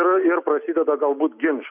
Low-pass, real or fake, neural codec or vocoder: 3.6 kHz; real; none